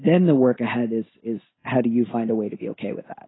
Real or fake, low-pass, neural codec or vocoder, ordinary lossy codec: real; 7.2 kHz; none; AAC, 16 kbps